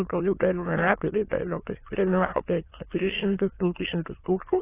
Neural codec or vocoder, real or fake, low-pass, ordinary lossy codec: autoencoder, 22.05 kHz, a latent of 192 numbers a frame, VITS, trained on many speakers; fake; 3.6 kHz; AAC, 16 kbps